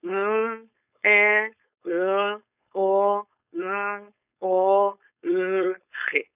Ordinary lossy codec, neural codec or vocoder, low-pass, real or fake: none; codec, 16 kHz, 4.8 kbps, FACodec; 3.6 kHz; fake